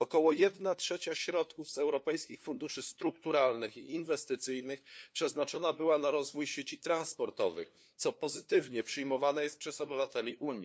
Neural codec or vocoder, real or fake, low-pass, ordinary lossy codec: codec, 16 kHz, 2 kbps, FunCodec, trained on LibriTTS, 25 frames a second; fake; none; none